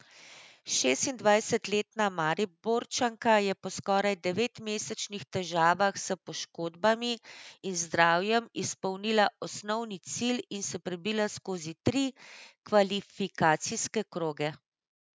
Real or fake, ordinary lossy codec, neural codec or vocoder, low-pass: real; none; none; none